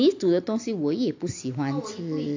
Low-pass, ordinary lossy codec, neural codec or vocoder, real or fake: 7.2 kHz; AAC, 48 kbps; none; real